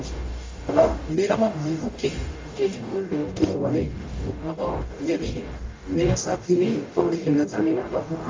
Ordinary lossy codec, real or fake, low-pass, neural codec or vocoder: Opus, 32 kbps; fake; 7.2 kHz; codec, 44.1 kHz, 0.9 kbps, DAC